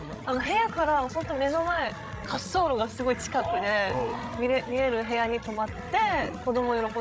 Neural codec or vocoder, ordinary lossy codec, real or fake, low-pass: codec, 16 kHz, 16 kbps, FreqCodec, larger model; none; fake; none